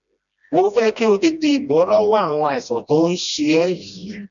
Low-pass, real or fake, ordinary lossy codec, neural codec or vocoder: 7.2 kHz; fake; none; codec, 16 kHz, 1 kbps, FreqCodec, smaller model